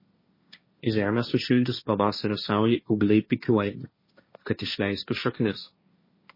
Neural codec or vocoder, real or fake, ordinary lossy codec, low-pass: codec, 16 kHz, 1.1 kbps, Voila-Tokenizer; fake; MP3, 24 kbps; 5.4 kHz